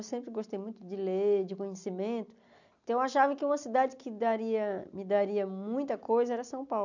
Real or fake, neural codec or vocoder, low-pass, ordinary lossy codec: real; none; 7.2 kHz; none